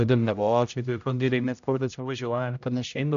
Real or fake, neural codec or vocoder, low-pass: fake; codec, 16 kHz, 0.5 kbps, X-Codec, HuBERT features, trained on general audio; 7.2 kHz